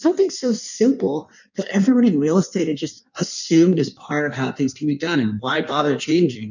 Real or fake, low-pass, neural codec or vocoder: fake; 7.2 kHz; codec, 16 kHz in and 24 kHz out, 1.1 kbps, FireRedTTS-2 codec